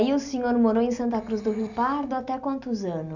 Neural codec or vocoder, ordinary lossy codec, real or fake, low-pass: none; none; real; 7.2 kHz